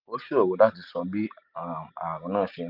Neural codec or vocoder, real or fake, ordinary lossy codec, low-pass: none; real; none; 5.4 kHz